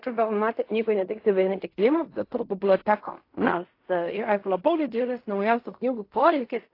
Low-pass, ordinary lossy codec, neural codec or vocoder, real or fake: 5.4 kHz; AAC, 32 kbps; codec, 16 kHz in and 24 kHz out, 0.4 kbps, LongCat-Audio-Codec, fine tuned four codebook decoder; fake